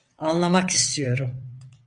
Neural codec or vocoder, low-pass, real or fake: vocoder, 22.05 kHz, 80 mel bands, WaveNeXt; 9.9 kHz; fake